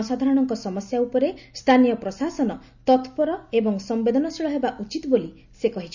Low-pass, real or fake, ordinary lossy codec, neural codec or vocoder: 7.2 kHz; real; none; none